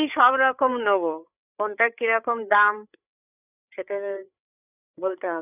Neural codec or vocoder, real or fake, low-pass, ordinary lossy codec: codec, 16 kHz, 8 kbps, FreqCodec, larger model; fake; 3.6 kHz; none